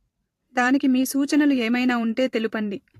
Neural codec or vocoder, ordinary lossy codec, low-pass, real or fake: vocoder, 44.1 kHz, 128 mel bands every 512 samples, BigVGAN v2; AAC, 48 kbps; 14.4 kHz; fake